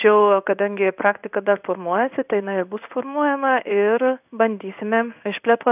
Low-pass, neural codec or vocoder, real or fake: 3.6 kHz; codec, 16 kHz in and 24 kHz out, 1 kbps, XY-Tokenizer; fake